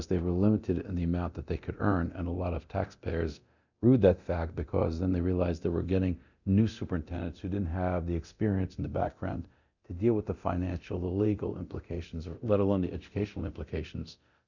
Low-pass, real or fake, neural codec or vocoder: 7.2 kHz; fake; codec, 24 kHz, 0.9 kbps, DualCodec